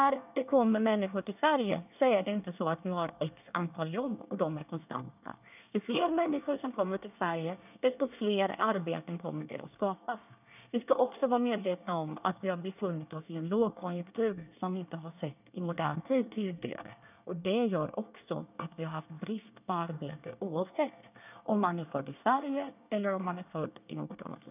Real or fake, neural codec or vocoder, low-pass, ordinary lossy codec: fake; codec, 24 kHz, 1 kbps, SNAC; 3.6 kHz; none